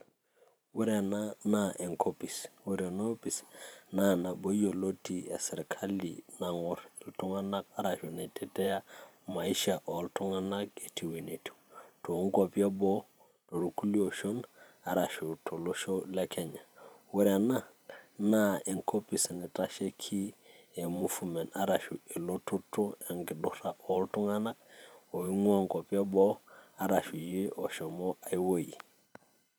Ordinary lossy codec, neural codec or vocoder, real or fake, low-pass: none; vocoder, 44.1 kHz, 128 mel bands every 512 samples, BigVGAN v2; fake; none